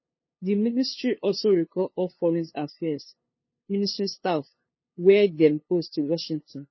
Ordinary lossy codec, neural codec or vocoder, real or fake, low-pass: MP3, 24 kbps; codec, 16 kHz, 2 kbps, FunCodec, trained on LibriTTS, 25 frames a second; fake; 7.2 kHz